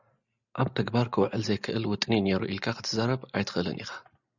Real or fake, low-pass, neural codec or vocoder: real; 7.2 kHz; none